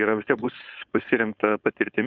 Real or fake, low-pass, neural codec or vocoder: fake; 7.2 kHz; codec, 16 kHz, 4.8 kbps, FACodec